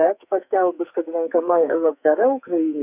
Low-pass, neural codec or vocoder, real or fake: 3.6 kHz; codec, 44.1 kHz, 2.6 kbps, SNAC; fake